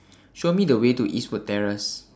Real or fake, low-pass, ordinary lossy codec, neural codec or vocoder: real; none; none; none